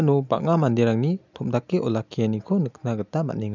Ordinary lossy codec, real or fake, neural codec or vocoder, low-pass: none; real; none; 7.2 kHz